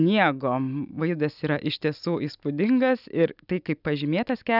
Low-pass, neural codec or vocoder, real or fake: 5.4 kHz; none; real